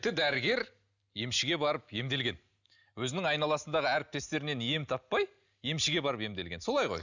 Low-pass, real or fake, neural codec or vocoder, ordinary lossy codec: 7.2 kHz; real; none; none